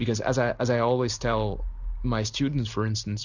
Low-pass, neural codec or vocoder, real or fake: 7.2 kHz; none; real